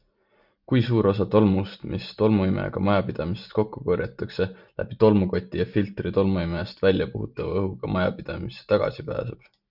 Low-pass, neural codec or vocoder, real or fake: 5.4 kHz; none; real